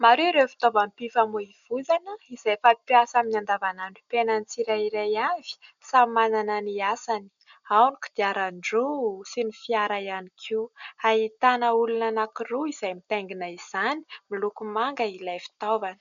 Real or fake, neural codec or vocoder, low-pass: real; none; 7.2 kHz